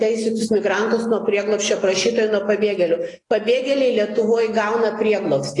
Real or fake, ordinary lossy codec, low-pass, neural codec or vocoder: real; AAC, 32 kbps; 10.8 kHz; none